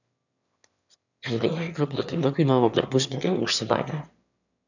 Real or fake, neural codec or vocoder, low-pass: fake; autoencoder, 22.05 kHz, a latent of 192 numbers a frame, VITS, trained on one speaker; 7.2 kHz